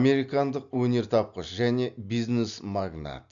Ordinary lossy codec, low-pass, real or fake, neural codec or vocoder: none; 7.2 kHz; real; none